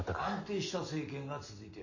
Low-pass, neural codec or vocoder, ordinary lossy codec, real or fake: 7.2 kHz; none; MP3, 32 kbps; real